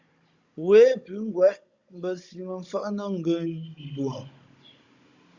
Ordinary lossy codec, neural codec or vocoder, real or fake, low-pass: Opus, 64 kbps; codec, 16 kHz, 16 kbps, FunCodec, trained on Chinese and English, 50 frames a second; fake; 7.2 kHz